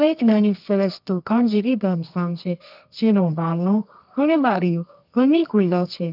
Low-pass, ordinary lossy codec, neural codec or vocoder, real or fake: 5.4 kHz; none; codec, 24 kHz, 0.9 kbps, WavTokenizer, medium music audio release; fake